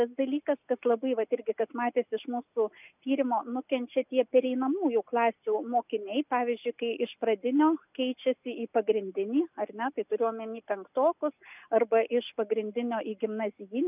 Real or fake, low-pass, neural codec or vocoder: real; 3.6 kHz; none